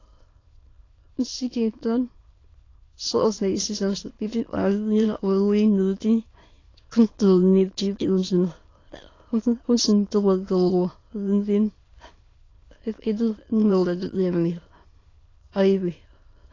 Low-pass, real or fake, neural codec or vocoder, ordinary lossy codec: 7.2 kHz; fake; autoencoder, 22.05 kHz, a latent of 192 numbers a frame, VITS, trained on many speakers; AAC, 32 kbps